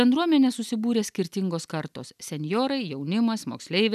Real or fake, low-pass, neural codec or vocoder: real; 14.4 kHz; none